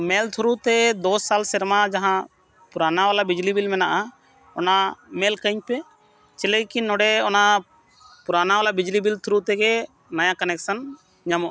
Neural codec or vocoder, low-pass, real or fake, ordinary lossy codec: none; none; real; none